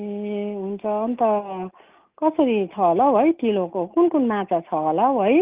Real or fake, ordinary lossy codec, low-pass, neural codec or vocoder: real; Opus, 24 kbps; 3.6 kHz; none